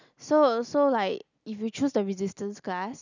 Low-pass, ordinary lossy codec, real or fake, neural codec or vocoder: 7.2 kHz; none; real; none